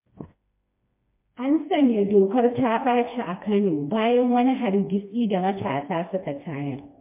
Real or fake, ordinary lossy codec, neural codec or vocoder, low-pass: fake; MP3, 24 kbps; codec, 16 kHz, 2 kbps, FreqCodec, smaller model; 3.6 kHz